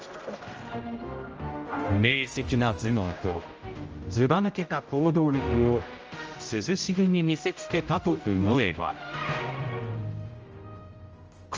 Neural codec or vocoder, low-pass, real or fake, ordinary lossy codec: codec, 16 kHz, 0.5 kbps, X-Codec, HuBERT features, trained on general audio; 7.2 kHz; fake; Opus, 24 kbps